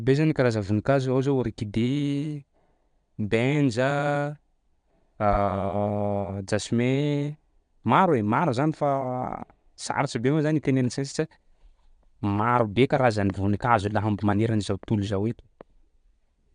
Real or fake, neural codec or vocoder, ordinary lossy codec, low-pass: fake; vocoder, 22.05 kHz, 80 mel bands, Vocos; none; 9.9 kHz